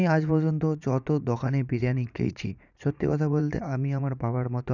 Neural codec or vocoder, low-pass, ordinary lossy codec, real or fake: vocoder, 44.1 kHz, 80 mel bands, Vocos; 7.2 kHz; none; fake